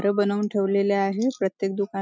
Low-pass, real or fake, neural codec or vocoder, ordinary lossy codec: none; real; none; none